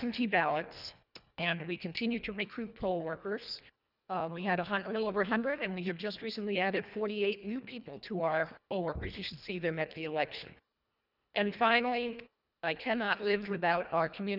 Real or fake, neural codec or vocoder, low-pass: fake; codec, 24 kHz, 1.5 kbps, HILCodec; 5.4 kHz